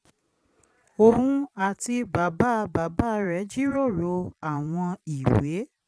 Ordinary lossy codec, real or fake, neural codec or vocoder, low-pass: none; fake; vocoder, 22.05 kHz, 80 mel bands, Vocos; none